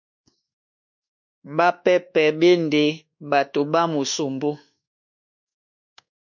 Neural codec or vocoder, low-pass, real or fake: codec, 24 kHz, 1.2 kbps, DualCodec; 7.2 kHz; fake